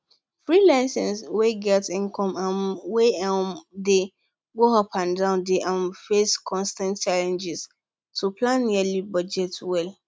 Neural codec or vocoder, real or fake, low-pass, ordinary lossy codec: none; real; none; none